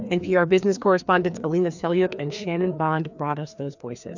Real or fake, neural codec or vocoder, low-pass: fake; codec, 16 kHz, 2 kbps, FreqCodec, larger model; 7.2 kHz